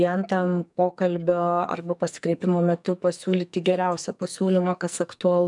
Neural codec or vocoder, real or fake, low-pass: codec, 44.1 kHz, 2.6 kbps, SNAC; fake; 10.8 kHz